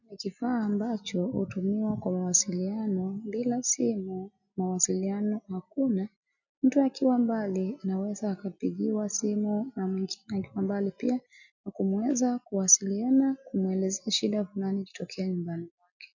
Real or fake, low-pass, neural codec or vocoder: real; 7.2 kHz; none